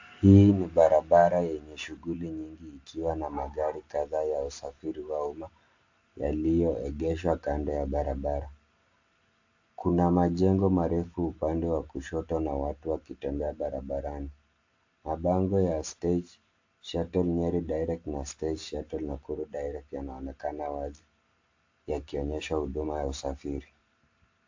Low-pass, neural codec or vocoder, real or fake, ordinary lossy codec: 7.2 kHz; none; real; AAC, 48 kbps